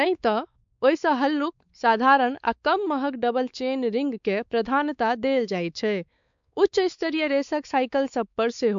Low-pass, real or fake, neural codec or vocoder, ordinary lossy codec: 7.2 kHz; fake; codec, 16 kHz, 4 kbps, X-Codec, WavLM features, trained on Multilingual LibriSpeech; MP3, 64 kbps